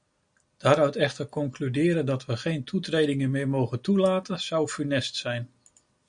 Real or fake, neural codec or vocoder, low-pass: real; none; 9.9 kHz